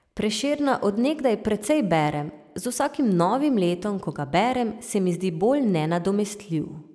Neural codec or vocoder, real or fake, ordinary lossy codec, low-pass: none; real; none; none